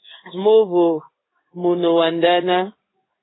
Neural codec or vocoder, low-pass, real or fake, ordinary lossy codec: codec, 16 kHz in and 24 kHz out, 1 kbps, XY-Tokenizer; 7.2 kHz; fake; AAC, 16 kbps